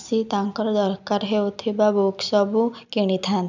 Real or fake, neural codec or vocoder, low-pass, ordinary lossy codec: real; none; 7.2 kHz; none